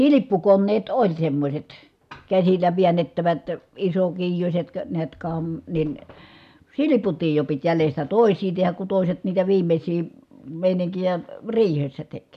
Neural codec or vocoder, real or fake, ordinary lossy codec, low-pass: none; real; none; 14.4 kHz